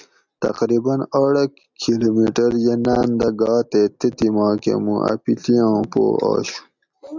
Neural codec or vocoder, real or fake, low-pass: none; real; 7.2 kHz